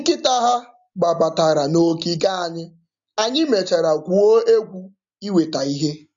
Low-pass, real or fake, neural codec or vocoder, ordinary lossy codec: 7.2 kHz; real; none; MP3, 64 kbps